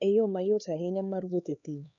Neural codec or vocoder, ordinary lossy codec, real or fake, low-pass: codec, 16 kHz, 2 kbps, X-Codec, HuBERT features, trained on LibriSpeech; none; fake; 7.2 kHz